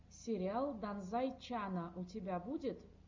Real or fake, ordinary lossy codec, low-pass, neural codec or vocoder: real; MP3, 64 kbps; 7.2 kHz; none